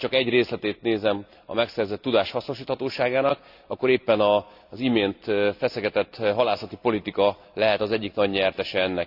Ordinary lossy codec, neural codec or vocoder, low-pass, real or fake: AAC, 48 kbps; none; 5.4 kHz; real